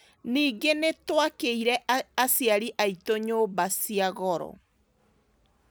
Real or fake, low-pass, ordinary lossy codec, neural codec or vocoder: real; none; none; none